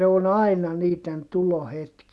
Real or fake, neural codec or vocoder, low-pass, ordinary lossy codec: real; none; none; none